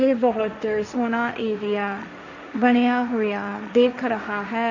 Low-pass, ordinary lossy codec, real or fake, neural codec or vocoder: 7.2 kHz; none; fake; codec, 16 kHz, 1.1 kbps, Voila-Tokenizer